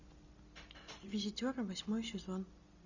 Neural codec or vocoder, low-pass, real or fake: none; 7.2 kHz; real